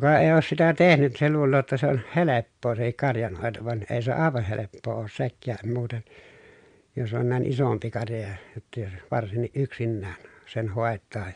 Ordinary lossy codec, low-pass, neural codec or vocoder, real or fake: MP3, 64 kbps; 9.9 kHz; none; real